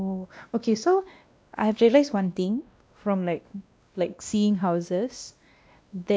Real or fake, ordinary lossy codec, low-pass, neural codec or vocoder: fake; none; none; codec, 16 kHz, 1 kbps, X-Codec, WavLM features, trained on Multilingual LibriSpeech